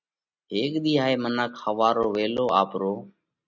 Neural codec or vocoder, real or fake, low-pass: none; real; 7.2 kHz